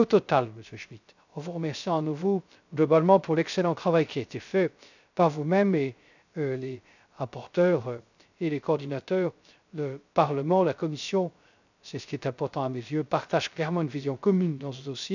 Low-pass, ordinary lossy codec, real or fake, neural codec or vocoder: 7.2 kHz; none; fake; codec, 16 kHz, 0.3 kbps, FocalCodec